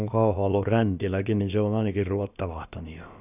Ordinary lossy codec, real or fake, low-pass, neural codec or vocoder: none; fake; 3.6 kHz; codec, 16 kHz, about 1 kbps, DyCAST, with the encoder's durations